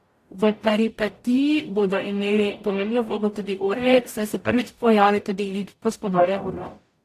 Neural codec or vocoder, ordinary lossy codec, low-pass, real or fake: codec, 44.1 kHz, 0.9 kbps, DAC; AAC, 64 kbps; 14.4 kHz; fake